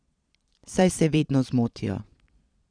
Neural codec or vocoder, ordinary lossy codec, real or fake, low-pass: vocoder, 22.05 kHz, 80 mel bands, Vocos; none; fake; 9.9 kHz